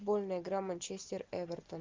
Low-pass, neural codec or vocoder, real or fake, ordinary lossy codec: 7.2 kHz; none; real; Opus, 32 kbps